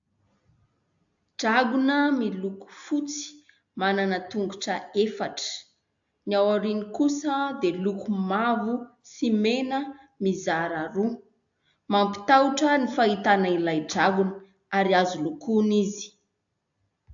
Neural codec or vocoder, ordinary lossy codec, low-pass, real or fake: none; AAC, 64 kbps; 7.2 kHz; real